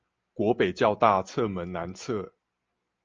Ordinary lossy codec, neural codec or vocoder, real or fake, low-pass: Opus, 24 kbps; none; real; 7.2 kHz